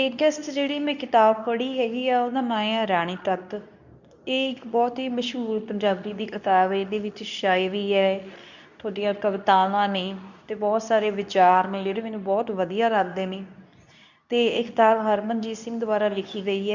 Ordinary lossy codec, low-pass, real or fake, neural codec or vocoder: none; 7.2 kHz; fake; codec, 24 kHz, 0.9 kbps, WavTokenizer, medium speech release version 2